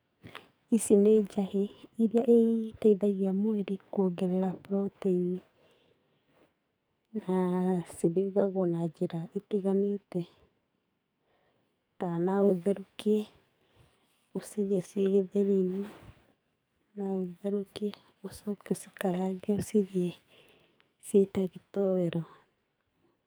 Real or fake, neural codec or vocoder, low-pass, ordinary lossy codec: fake; codec, 44.1 kHz, 2.6 kbps, SNAC; none; none